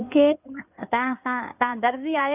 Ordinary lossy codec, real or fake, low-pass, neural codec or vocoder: none; fake; 3.6 kHz; codec, 16 kHz in and 24 kHz out, 2.2 kbps, FireRedTTS-2 codec